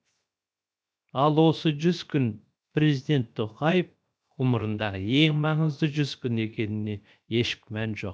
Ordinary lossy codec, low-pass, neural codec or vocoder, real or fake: none; none; codec, 16 kHz, 0.7 kbps, FocalCodec; fake